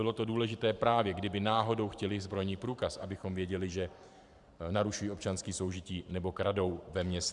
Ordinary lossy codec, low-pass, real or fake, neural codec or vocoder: Opus, 64 kbps; 10.8 kHz; fake; vocoder, 44.1 kHz, 128 mel bands every 512 samples, BigVGAN v2